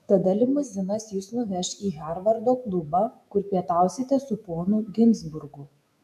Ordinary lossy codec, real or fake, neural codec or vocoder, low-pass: AAC, 96 kbps; fake; autoencoder, 48 kHz, 128 numbers a frame, DAC-VAE, trained on Japanese speech; 14.4 kHz